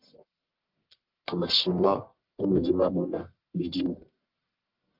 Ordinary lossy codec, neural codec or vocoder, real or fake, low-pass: Opus, 32 kbps; codec, 44.1 kHz, 1.7 kbps, Pupu-Codec; fake; 5.4 kHz